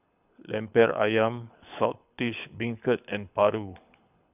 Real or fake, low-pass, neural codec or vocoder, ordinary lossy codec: fake; 3.6 kHz; codec, 24 kHz, 6 kbps, HILCodec; none